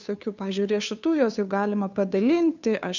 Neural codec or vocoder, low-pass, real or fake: codec, 16 kHz, 2 kbps, FunCodec, trained on Chinese and English, 25 frames a second; 7.2 kHz; fake